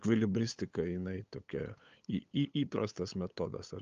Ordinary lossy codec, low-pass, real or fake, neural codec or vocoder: Opus, 32 kbps; 7.2 kHz; fake; codec, 16 kHz, 8 kbps, FunCodec, trained on LibriTTS, 25 frames a second